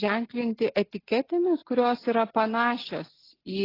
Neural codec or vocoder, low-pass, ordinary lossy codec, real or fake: none; 5.4 kHz; AAC, 24 kbps; real